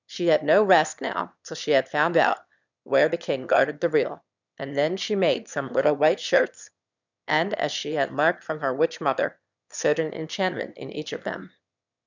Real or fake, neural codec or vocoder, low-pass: fake; autoencoder, 22.05 kHz, a latent of 192 numbers a frame, VITS, trained on one speaker; 7.2 kHz